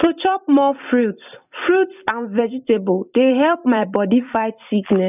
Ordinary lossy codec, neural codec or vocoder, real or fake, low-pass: none; vocoder, 44.1 kHz, 128 mel bands every 256 samples, BigVGAN v2; fake; 3.6 kHz